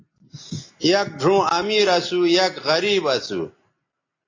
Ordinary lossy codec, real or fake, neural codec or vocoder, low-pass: AAC, 32 kbps; real; none; 7.2 kHz